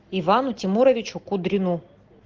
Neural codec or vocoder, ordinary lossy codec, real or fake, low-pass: none; Opus, 16 kbps; real; 7.2 kHz